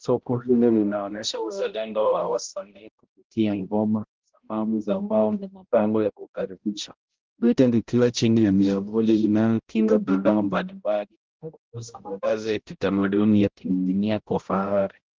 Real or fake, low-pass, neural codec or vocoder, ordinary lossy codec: fake; 7.2 kHz; codec, 16 kHz, 0.5 kbps, X-Codec, HuBERT features, trained on balanced general audio; Opus, 16 kbps